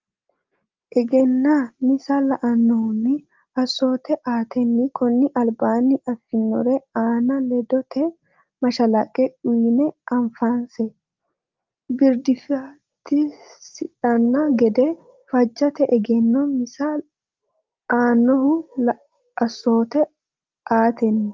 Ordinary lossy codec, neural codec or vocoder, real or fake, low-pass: Opus, 32 kbps; none; real; 7.2 kHz